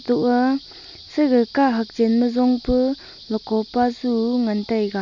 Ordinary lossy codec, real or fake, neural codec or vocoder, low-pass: none; real; none; 7.2 kHz